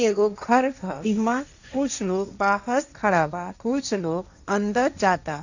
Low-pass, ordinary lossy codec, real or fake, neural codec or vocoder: 7.2 kHz; none; fake; codec, 16 kHz, 1.1 kbps, Voila-Tokenizer